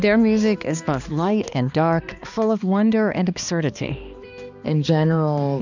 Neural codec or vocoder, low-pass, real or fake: codec, 16 kHz, 2 kbps, X-Codec, HuBERT features, trained on balanced general audio; 7.2 kHz; fake